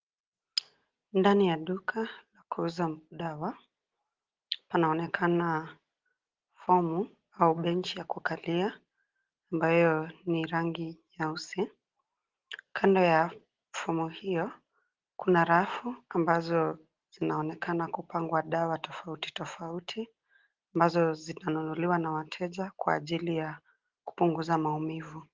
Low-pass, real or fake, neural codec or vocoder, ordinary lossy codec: 7.2 kHz; real; none; Opus, 16 kbps